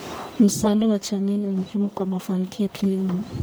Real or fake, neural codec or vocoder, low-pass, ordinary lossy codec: fake; codec, 44.1 kHz, 1.7 kbps, Pupu-Codec; none; none